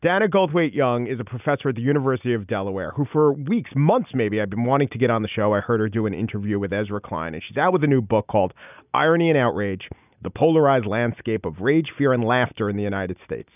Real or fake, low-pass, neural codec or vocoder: real; 3.6 kHz; none